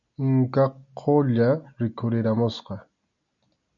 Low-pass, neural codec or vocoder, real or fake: 7.2 kHz; none; real